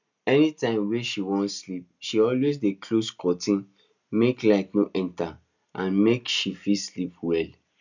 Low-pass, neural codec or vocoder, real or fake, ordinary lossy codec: 7.2 kHz; none; real; none